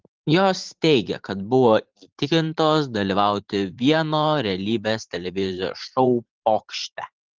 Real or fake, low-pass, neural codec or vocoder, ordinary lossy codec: fake; 7.2 kHz; vocoder, 44.1 kHz, 128 mel bands every 512 samples, BigVGAN v2; Opus, 16 kbps